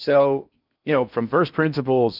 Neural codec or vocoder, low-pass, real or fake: codec, 16 kHz in and 24 kHz out, 0.6 kbps, FocalCodec, streaming, 4096 codes; 5.4 kHz; fake